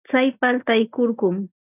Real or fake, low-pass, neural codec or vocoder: real; 3.6 kHz; none